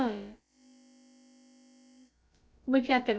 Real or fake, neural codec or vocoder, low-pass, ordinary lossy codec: fake; codec, 16 kHz, about 1 kbps, DyCAST, with the encoder's durations; none; none